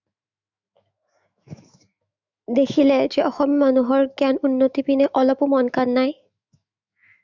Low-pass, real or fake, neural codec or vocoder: 7.2 kHz; fake; autoencoder, 48 kHz, 128 numbers a frame, DAC-VAE, trained on Japanese speech